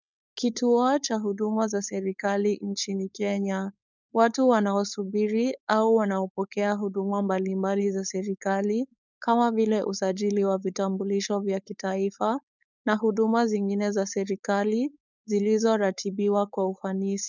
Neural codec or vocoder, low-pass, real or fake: codec, 16 kHz, 4.8 kbps, FACodec; 7.2 kHz; fake